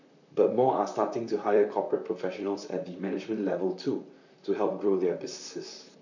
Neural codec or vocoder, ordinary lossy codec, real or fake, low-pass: vocoder, 44.1 kHz, 128 mel bands, Pupu-Vocoder; none; fake; 7.2 kHz